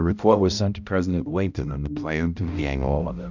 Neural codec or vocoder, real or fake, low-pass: codec, 16 kHz, 0.5 kbps, X-Codec, HuBERT features, trained on balanced general audio; fake; 7.2 kHz